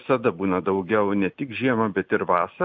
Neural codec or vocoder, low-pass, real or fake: none; 7.2 kHz; real